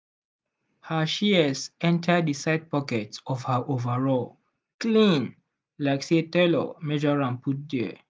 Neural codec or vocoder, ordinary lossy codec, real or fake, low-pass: none; none; real; none